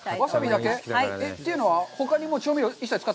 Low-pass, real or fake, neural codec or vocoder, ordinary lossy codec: none; real; none; none